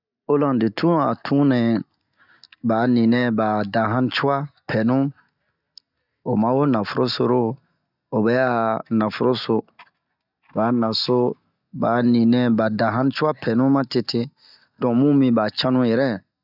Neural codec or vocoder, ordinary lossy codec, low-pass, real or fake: none; none; 5.4 kHz; real